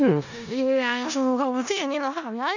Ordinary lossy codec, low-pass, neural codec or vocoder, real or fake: none; 7.2 kHz; codec, 16 kHz in and 24 kHz out, 0.4 kbps, LongCat-Audio-Codec, four codebook decoder; fake